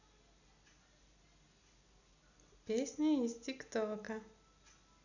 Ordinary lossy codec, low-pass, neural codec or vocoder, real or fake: none; 7.2 kHz; none; real